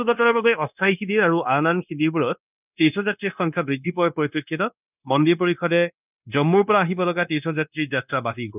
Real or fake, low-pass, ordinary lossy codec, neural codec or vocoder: fake; 3.6 kHz; none; codec, 16 kHz, 0.9 kbps, LongCat-Audio-Codec